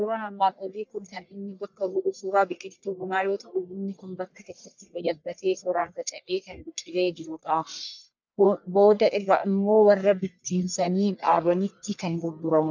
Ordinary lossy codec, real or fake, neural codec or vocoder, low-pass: MP3, 64 kbps; fake; codec, 44.1 kHz, 1.7 kbps, Pupu-Codec; 7.2 kHz